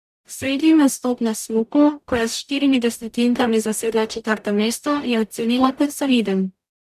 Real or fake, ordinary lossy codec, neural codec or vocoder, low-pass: fake; none; codec, 44.1 kHz, 0.9 kbps, DAC; 14.4 kHz